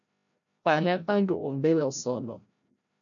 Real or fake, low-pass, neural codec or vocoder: fake; 7.2 kHz; codec, 16 kHz, 0.5 kbps, FreqCodec, larger model